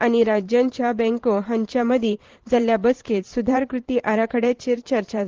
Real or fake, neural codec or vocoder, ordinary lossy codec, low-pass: fake; vocoder, 44.1 kHz, 128 mel bands every 512 samples, BigVGAN v2; Opus, 16 kbps; 7.2 kHz